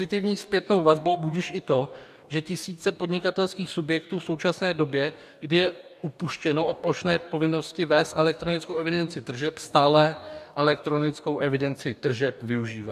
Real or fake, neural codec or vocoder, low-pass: fake; codec, 44.1 kHz, 2.6 kbps, DAC; 14.4 kHz